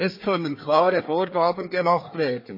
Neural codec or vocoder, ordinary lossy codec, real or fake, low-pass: codec, 24 kHz, 1 kbps, SNAC; MP3, 24 kbps; fake; 5.4 kHz